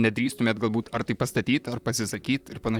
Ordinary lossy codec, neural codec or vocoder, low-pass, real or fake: Opus, 64 kbps; vocoder, 44.1 kHz, 128 mel bands, Pupu-Vocoder; 19.8 kHz; fake